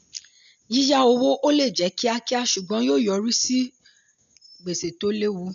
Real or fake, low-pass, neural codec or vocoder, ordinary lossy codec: real; 7.2 kHz; none; none